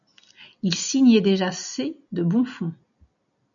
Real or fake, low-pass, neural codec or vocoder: real; 7.2 kHz; none